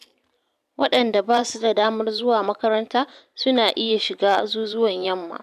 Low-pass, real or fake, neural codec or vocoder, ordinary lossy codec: 14.4 kHz; fake; vocoder, 44.1 kHz, 128 mel bands every 512 samples, BigVGAN v2; none